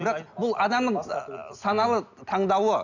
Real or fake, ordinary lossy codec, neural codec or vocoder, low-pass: real; none; none; 7.2 kHz